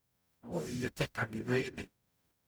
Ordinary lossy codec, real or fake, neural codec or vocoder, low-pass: none; fake; codec, 44.1 kHz, 0.9 kbps, DAC; none